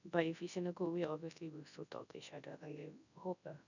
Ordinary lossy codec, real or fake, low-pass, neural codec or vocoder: none; fake; 7.2 kHz; codec, 24 kHz, 0.9 kbps, WavTokenizer, large speech release